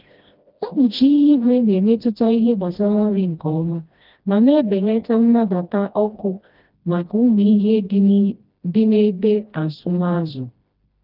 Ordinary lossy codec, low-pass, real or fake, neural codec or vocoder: Opus, 32 kbps; 5.4 kHz; fake; codec, 16 kHz, 1 kbps, FreqCodec, smaller model